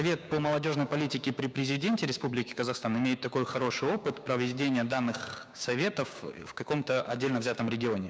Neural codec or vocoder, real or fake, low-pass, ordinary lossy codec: codec, 16 kHz, 6 kbps, DAC; fake; none; none